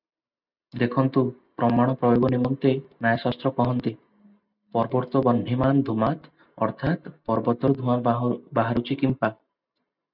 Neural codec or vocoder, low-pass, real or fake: none; 5.4 kHz; real